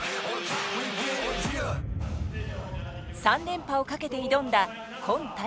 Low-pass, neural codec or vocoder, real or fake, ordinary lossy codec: none; none; real; none